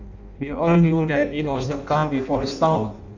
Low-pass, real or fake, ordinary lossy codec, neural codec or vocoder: 7.2 kHz; fake; none; codec, 16 kHz in and 24 kHz out, 0.6 kbps, FireRedTTS-2 codec